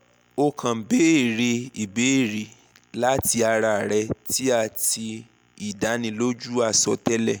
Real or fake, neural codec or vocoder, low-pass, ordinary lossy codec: real; none; none; none